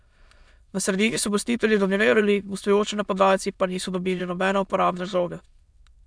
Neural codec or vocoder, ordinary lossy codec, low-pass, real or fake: autoencoder, 22.05 kHz, a latent of 192 numbers a frame, VITS, trained on many speakers; none; none; fake